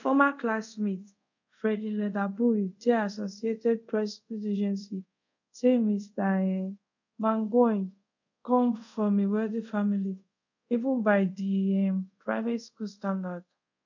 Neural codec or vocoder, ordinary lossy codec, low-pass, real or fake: codec, 24 kHz, 0.5 kbps, DualCodec; none; 7.2 kHz; fake